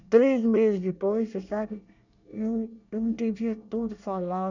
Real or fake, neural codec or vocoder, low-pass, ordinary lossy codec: fake; codec, 24 kHz, 1 kbps, SNAC; 7.2 kHz; none